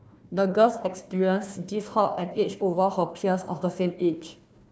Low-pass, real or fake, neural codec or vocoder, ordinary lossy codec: none; fake; codec, 16 kHz, 1 kbps, FunCodec, trained on Chinese and English, 50 frames a second; none